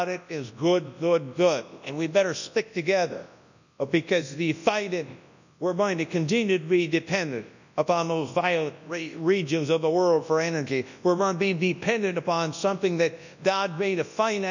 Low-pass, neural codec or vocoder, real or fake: 7.2 kHz; codec, 24 kHz, 0.9 kbps, WavTokenizer, large speech release; fake